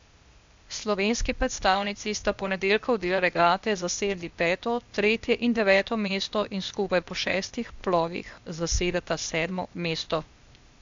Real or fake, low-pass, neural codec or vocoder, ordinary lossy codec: fake; 7.2 kHz; codec, 16 kHz, 0.8 kbps, ZipCodec; MP3, 64 kbps